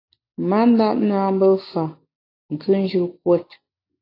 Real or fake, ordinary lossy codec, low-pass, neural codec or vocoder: real; AAC, 32 kbps; 5.4 kHz; none